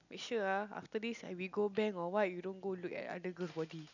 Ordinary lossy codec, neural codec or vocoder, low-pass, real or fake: none; none; 7.2 kHz; real